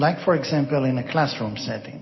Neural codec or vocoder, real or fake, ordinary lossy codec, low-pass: none; real; MP3, 24 kbps; 7.2 kHz